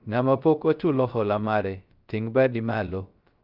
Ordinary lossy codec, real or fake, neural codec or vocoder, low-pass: Opus, 24 kbps; fake; codec, 16 kHz, 0.3 kbps, FocalCodec; 5.4 kHz